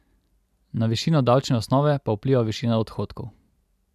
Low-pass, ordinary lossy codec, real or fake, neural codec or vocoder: 14.4 kHz; none; real; none